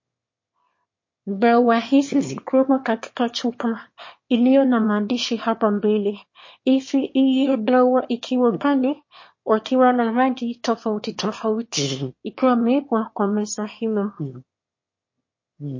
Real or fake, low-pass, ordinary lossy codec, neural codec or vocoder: fake; 7.2 kHz; MP3, 32 kbps; autoencoder, 22.05 kHz, a latent of 192 numbers a frame, VITS, trained on one speaker